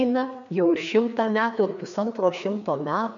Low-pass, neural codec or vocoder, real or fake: 7.2 kHz; codec, 16 kHz, 2 kbps, FreqCodec, larger model; fake